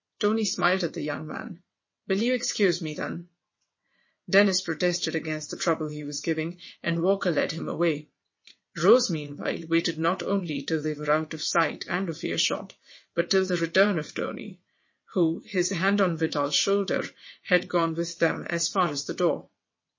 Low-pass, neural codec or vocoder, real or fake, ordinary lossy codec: 7.2 kHz; vocoder, 22.05 kHz, 80 mel bands, WaveNeXt; fake; MP3, 32 kbps